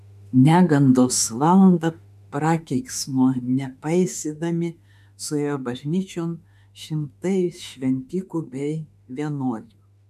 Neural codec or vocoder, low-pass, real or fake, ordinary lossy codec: autoencoder, 48 kHz, 32 numbers a frame, DAC-VAE, trained on Japanese speech; 14.4 kHz; fake; MP3, 96 kbps